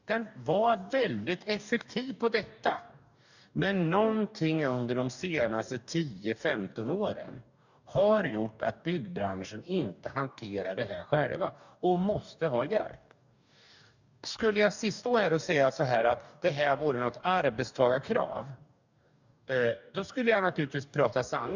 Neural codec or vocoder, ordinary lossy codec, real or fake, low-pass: codec, 44.1 kHz, 2.6 kbps, DAC; none; fake; 7.2 kHz